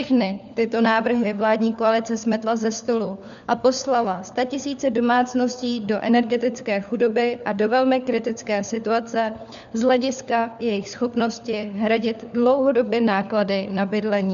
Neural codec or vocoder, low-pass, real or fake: codec, 16 kHz, 4 kbps, FunCodec, trained on LibriTTS, 50 frames a second; 7.2 kHz; fake